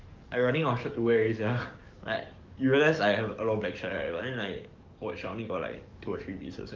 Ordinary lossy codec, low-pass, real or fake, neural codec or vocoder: Opus, 32 kbps; 7.2 kHz; fake; codec, 44.1 kHz, 7.8 kbps, DAC